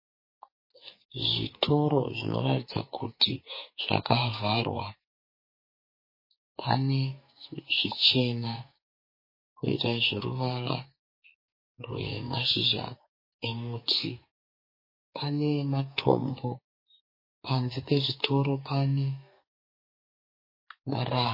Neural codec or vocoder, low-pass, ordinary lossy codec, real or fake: codec, 44.1 kHz, 2.6 kbps, SNAC; 5.4 kHz; MP3, 24 kbps; fake